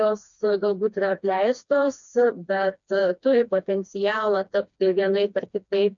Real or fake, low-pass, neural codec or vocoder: fake; 7.2 kHz; codec, 16 kHz, 2 kbps, FreqCodec, smaller model